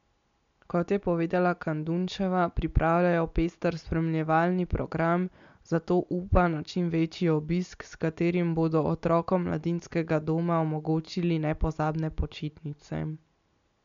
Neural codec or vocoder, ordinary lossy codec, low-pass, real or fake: none; MP3, 64 kbps; 7.2 kHz; real